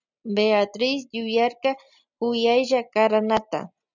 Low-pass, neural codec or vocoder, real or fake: 7.2 kHz; none; real